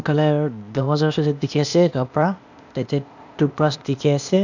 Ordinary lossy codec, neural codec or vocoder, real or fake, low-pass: none; codec, 16 kHz, 0.8 kbps, ZipCodec; fake; 7.2 kHz